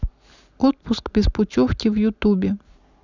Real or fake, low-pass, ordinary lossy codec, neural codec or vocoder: real; 7.2 kHz; none; none